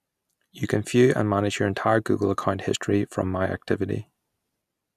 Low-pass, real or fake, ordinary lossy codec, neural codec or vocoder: 14.4 kHz; real; none; none